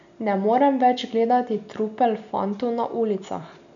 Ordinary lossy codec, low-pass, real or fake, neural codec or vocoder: none; 7.2 kHz; real; none